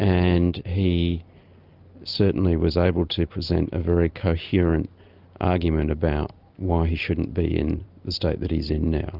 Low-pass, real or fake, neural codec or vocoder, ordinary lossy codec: 5.4 kHz; real; none; Opus, 24 kbps